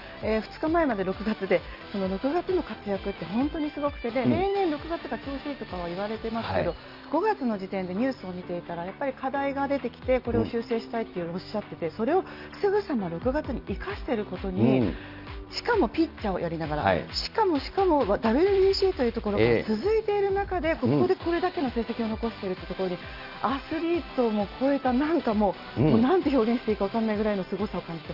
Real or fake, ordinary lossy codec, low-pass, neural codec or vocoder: real; Opus, 24 kbps; 5.4 kHz; none